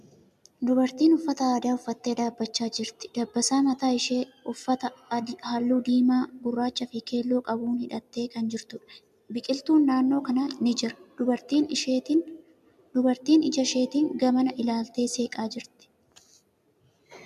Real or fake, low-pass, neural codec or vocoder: real; 14.4 kHz; none